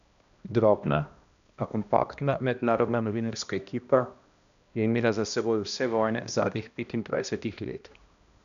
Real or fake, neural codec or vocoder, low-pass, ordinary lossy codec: fake; codec, 16 kHz, 1 kbps, X-Codec, HuBERT features, trained on balanced general audio; 7.2 kHz; none